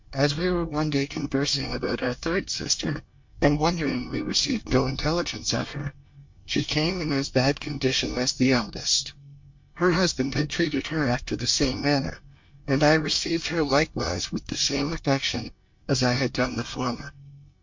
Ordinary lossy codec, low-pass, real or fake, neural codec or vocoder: MP3, 48 kbps; 7.2 kHz; fake; codec, 24 kHz, 1 kbps, SNAC